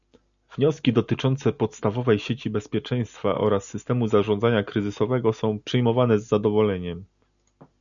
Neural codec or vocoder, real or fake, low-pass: none; real; 7.2 kHz